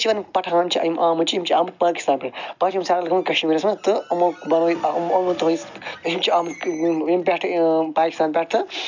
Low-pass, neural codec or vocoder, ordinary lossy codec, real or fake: 7.2 kHz; none; none; real